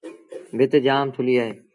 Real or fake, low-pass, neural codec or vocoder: real; 10.8 kHz; none